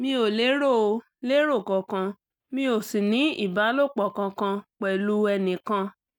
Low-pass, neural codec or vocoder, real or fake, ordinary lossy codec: 19.8 kHz; none; real; none